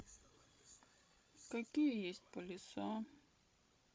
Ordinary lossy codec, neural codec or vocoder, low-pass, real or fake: none; codec, 16 kHz, 16 kbps, FreqCodec, larger model; none; fake